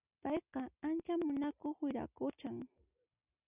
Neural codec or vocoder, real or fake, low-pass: none; real; 3.6 kHz